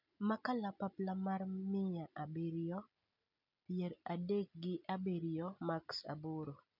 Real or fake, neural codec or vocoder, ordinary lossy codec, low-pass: real; none; none; 5.4 kHz